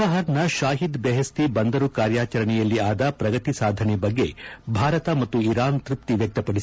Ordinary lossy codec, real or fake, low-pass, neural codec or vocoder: none; real; none; none